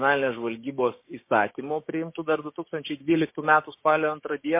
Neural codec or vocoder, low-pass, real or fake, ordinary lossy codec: codec, 16 kHz, 6 kbps, DAC; 3.6 kHz; fake; MP3, 24 kbps